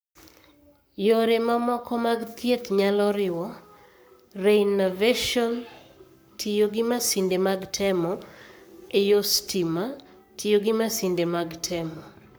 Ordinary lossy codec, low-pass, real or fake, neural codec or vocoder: none; none; fake; codec, 44.1 kHz, 7.8 kbps, Pupu-Codec